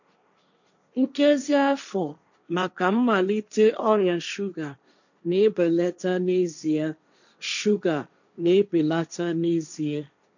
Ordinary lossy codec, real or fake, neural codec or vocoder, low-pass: none; fake; codec, 16 kHz, 1.1 kbps, Voila-Tokenizer; 7.2 kHz